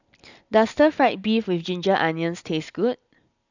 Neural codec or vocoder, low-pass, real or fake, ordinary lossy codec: none; 7.2 kHz; real; none